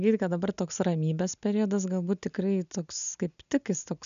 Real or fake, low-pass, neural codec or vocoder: real; 7.2 kHz; none